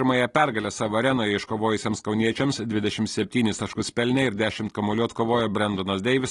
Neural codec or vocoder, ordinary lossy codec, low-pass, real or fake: none; AAC, 32 kbps; 10.8 kHz; real